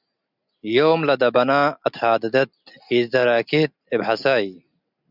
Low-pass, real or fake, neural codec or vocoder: 5.4 kHz; real; none